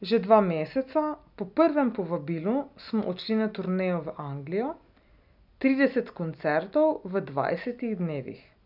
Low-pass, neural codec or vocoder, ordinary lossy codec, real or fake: 5.4 kHz; none; none; real